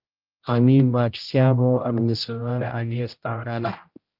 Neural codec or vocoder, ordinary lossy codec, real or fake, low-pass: codec, 16 kHz, 0.5 kbps, X-Codec, HuBERT features, trained on general audio; Opus, 32 kbps; fake; 5.4 kHz